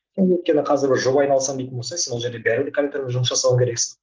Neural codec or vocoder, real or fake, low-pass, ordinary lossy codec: none; real; 7.2 kHz; Opus, 32 kbps